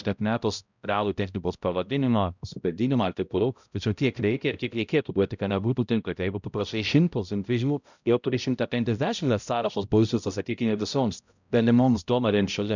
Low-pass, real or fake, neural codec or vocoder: 7.2 kHz; fake; codec, 16 kHz, 0.5 kbps, X-Codec, HuBERT features, trained on balanced general audio